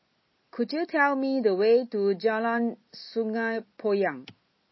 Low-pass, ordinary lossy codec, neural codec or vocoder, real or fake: 7.2 kHz; MP3, 24 kbps; none; real